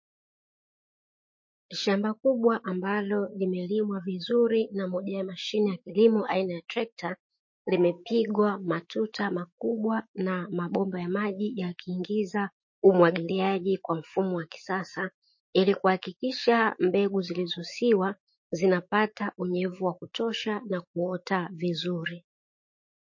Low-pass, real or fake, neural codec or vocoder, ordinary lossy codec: 7.2 kHz; fake; autoencoder, 48 kHz, 128 numbers a frame, DAC-VAE, trained on Japanese speech; MP3, 32 kbps